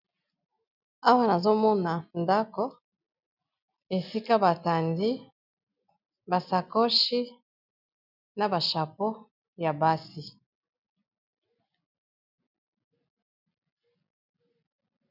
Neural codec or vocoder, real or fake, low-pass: none; real; 5.4 kHz